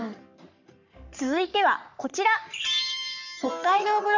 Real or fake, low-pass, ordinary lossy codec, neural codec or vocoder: fake; 7.2 kHz; none; codec, 44.1 kHz, 3.4 kbps, Pupu-Codec